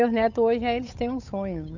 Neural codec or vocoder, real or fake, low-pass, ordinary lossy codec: codec, 16 kHz, 8 kbps, FunCodec, trained on Chinese and English, 25 frames a second; fake; 7.2 kHz; none